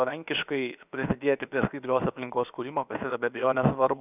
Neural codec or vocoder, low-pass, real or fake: codec, 16 kHz, about 1 kbps, DyCAST, with the encoder's durations; 3.6 kHz; fake